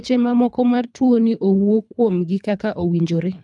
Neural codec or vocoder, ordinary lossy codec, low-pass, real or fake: codec, 24 kHz, 3 kbps, HILCodec; none; 10.8 kHz; fake